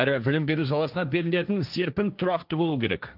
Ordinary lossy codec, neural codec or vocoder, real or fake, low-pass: Opus, 24 kbps; codec, 16 kHz, 1.1 kbps, Voila-Tokenizer; fake; 5.4 kHz